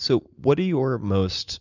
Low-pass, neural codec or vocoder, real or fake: 7.2 kHz; none; real